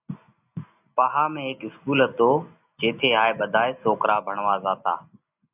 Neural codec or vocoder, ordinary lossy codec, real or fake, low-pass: none; AAC, 32 kbps; real; 3.6 kHz